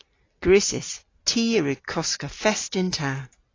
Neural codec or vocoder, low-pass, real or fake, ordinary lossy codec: none; 7.2 kHz; real; AAC, 32 kbps